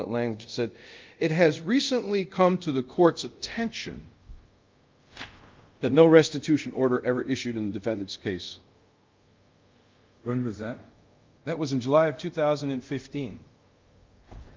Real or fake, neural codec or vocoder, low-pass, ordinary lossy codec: fake; codec, 24 kHz, 0.5 kbps, DualCodec; 7.2 kHz; Opus, 24 kbps